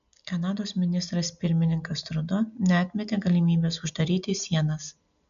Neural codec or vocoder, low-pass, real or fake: none; 7.2 kHz; real